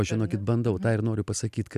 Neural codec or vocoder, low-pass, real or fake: none; 14.4 kHz; real